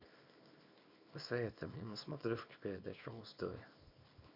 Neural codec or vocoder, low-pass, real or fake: codec, 24 kHz, 0.9 kbps, WavTokenizer, small release; 5.4 kHz; fake